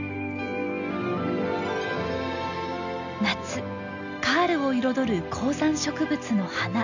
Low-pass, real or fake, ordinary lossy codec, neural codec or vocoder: 7.2 kHz; real; none; none